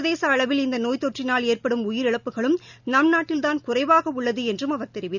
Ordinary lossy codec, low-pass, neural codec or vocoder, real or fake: none; 7.2 kHz; none; real